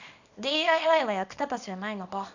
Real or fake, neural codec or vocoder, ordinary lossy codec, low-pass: fake; codec, 24 kHz, 0.9 kbps, WavTokenizer, small release; none; 7.2 kHz